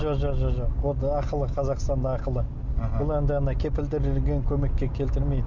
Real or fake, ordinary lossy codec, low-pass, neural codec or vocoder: real; none; 7.2 kHz; none